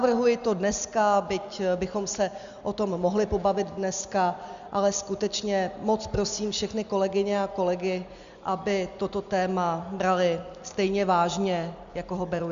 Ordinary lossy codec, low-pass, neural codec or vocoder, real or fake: Opus, 64 kbps; 7.2 kHz; none; real